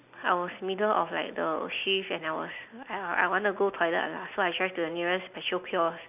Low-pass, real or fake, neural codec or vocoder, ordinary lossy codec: 3.6 kHz; real; none; none